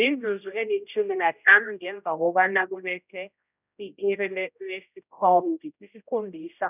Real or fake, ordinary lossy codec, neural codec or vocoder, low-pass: fake; none; codec, 16 kHz, 0.5 kbps, X-Codec, HuBERT features, trained on general audio; 3.6 kHz